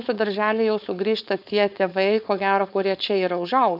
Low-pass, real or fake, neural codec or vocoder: 5.4 kHz; fake; codec, 16 kHz, 4.8 kbps, FACodec